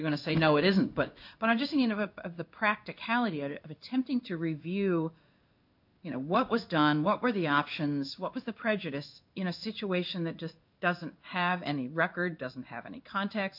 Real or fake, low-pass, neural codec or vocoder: fake; 5.4 kHz; codec, 16 kHz in and 24 kHz out, 1 kbps, XY-Tokenizer